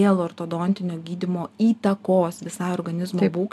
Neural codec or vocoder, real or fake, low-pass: none; real; 14.4 kHz